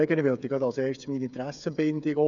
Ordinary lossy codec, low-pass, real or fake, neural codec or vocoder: none; 7.2 kHz; fake; codec, 16 kHz, 8 kbps, FreqCodec, smaller model